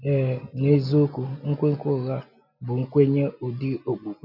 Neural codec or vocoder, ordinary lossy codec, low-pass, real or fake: none; none; 5.4 kHz; real